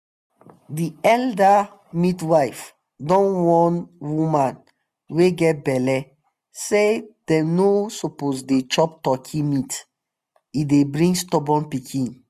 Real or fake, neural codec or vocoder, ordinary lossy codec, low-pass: real; none; none; 14.4 kHz